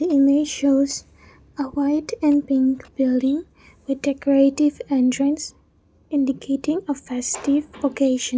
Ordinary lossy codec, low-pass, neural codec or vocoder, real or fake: none; none; none; real